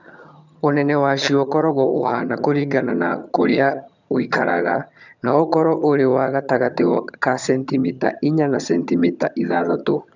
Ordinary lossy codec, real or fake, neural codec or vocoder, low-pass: none; fake; vocoder, 22.05 kHz, 80 mel bands, HiFi-GAN; 7.2 kHz